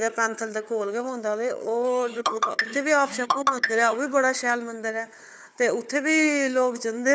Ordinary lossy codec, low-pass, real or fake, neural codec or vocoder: none; none; fake; codec, 16 kHz, 4 kbps, FunCodec, trained on Chinese and English, 50 frames a second